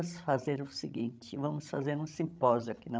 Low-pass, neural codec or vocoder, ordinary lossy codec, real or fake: none; codec, 16 kHz, 16 kbps, FreqCodec, larger model; none; fake